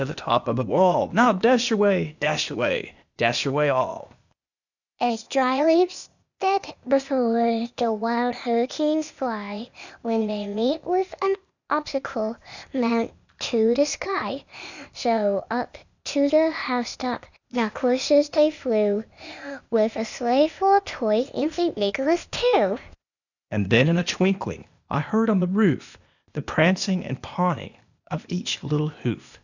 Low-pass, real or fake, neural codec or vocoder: 7.2 kHz; fake; codec, 16 kHz, 0.8 kbps, ZipCodec